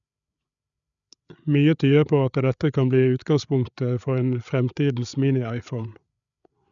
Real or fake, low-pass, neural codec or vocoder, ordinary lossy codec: fake; 7.2 kHz; codec, 16 kHz, 8 kbps, FreqCodec, larger model; none